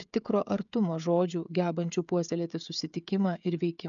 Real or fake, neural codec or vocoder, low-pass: fake; codec, 16 kHz, 16 kbps, FreqCodec, smaller model; 7.2 kHz